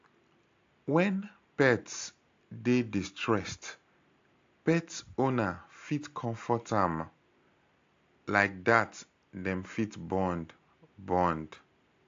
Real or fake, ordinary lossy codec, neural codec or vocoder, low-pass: real; AAC, 48 kbps; none; 7.2 kHz